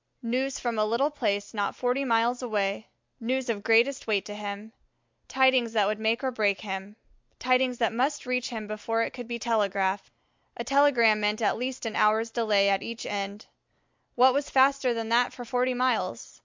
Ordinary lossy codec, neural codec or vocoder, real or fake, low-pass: MP3, 64 kbps; none; real; 7.2 kHz